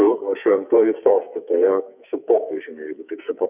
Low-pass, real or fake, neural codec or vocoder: 3.6 kHz; fake; codec, 44.1 kHz, 2.6 kbps, SNAC